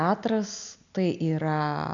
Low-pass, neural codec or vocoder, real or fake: 7.2 kHz; none; real